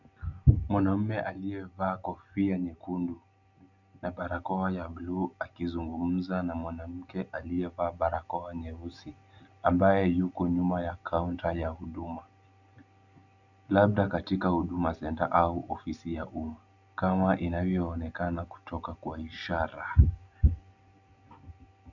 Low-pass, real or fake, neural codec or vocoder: 7.2 kHz; real; none